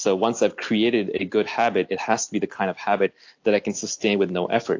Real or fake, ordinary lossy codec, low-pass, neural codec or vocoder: real; AAC, 48 kbps; 7.2 kHz; none